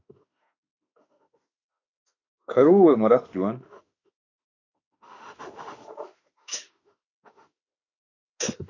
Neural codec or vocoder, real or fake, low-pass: autoencoder, 48 kHz, 32 numbers a frame, DAC-VAE, trained on Japanese speech; fake; 7.2 kHz